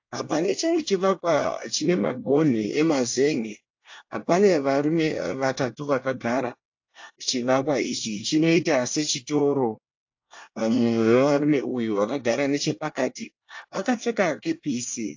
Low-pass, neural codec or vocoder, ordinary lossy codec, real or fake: 7.2 kHz; codec, 24 kHz, 1 kbps, SNAC; AAC, 48 kbps; fake